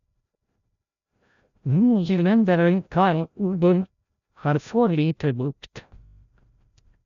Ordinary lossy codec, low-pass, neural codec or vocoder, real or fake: none; 7.2 kHz; codec, 16 kHz, 0.5 kbps, FreqCodec, larger model; fake